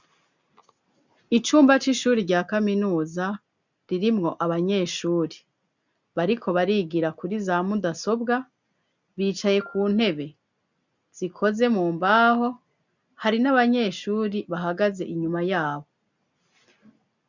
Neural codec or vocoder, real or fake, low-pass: none; real; 7.2 kHz